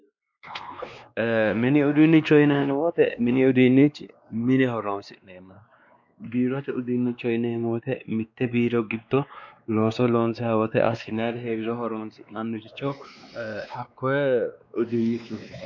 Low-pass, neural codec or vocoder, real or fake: 7.2 kHz; codec, 16 kHz, 2 kbps, X-Codec, WavLM features, trained on Multilingual LibriSpeech; fake